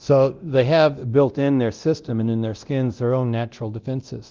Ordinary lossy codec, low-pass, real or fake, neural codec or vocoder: Opus, 32 kbps; 7.2 kHz; fake; codec, 24 kHz, 0.9 kbps, DualCodec